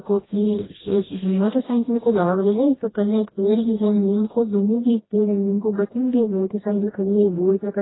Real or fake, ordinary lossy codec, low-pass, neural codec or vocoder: fake; AAC, 16 kbps; 7.2 kHz; codec, 16 kHz, 1 kbps, FreqCodec, smaller model